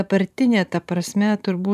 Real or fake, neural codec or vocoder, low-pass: fake; vocoder, 44.1 kHz, 128 mel bands every 512 samples, BigVGAN v2; 14.4 kHz